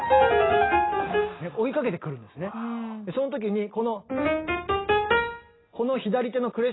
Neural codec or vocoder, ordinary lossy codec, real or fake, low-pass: none; AAC, 16 kbps; real; 7.2 kHz